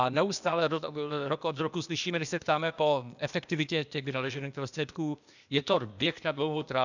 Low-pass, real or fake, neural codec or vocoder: 7.2 kHz; fake; codec, 16 kHz, 0.8 kbps, ZipCodec